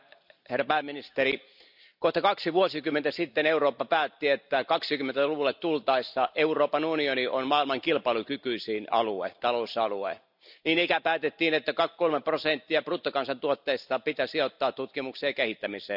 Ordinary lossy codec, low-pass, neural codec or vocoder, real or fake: none; 5.4 kHz; none; real